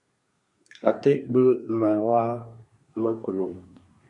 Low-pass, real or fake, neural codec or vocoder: 10.8 kHz; fake; codec, 24 kHz, 1 kbps, SNAC